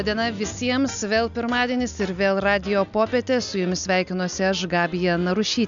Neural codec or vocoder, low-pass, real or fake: none; 7.2 kHz; real